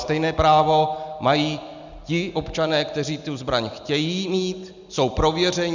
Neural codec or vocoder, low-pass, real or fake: none; 7.2 kHz; real